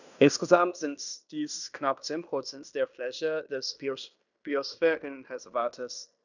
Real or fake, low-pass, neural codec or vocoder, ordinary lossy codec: fake; 7.2 kHz; codec, 16 kHz in and 24 kHz out, 0.9 kbps, LongCat-Audio-Codec, fine tuned four codebook decoder; none